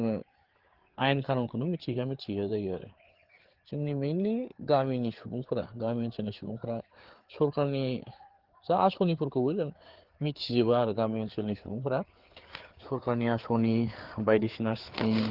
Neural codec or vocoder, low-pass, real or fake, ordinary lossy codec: codec, 16 kHz, 4 kbps, FreqCodec, larger model; 5.4 kHz; fake; Opus, 16 kbps